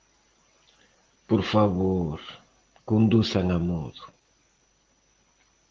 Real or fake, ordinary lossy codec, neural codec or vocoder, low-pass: real; Opus, 16 kbps; none; 7.2 kHz